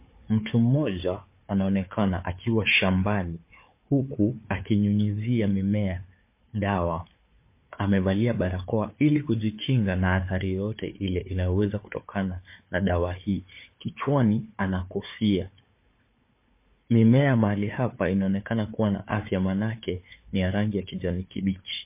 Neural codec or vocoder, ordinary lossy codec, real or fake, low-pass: codec, 16 kHz, 4 kbps, FunCodec, trained on Chinese and English, 50 frames a second; MP3, 24 kbps; fake; 3.6 kHz